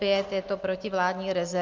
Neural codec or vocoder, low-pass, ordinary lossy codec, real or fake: none; 7.2 kHz; Opus, 32 kbps; real